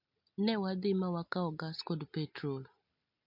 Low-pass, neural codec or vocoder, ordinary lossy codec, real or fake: 5.4 kHz; none; MP3, 48 kbps; real